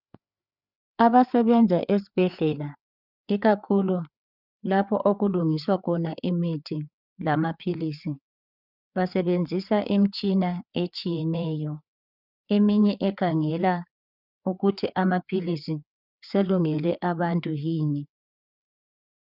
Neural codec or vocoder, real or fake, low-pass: codec, 16 kHz, 4 kbps, FreqCodec, larger model; fake; 5.4 kHz